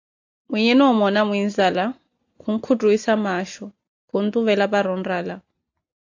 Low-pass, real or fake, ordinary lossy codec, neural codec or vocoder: 7.2 kHz; real; AAC, 48 kbps; none